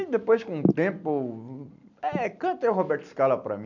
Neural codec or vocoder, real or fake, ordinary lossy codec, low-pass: none; real; none; 7.2 kHz